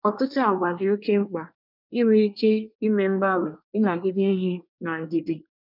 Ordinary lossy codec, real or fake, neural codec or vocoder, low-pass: none; fake; codec, 24 kHz, 1 kbps, SNAC; 5.4 kHz